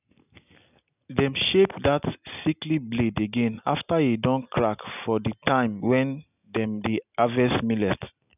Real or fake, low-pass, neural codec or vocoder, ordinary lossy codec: real; 3.6 kHz; none; none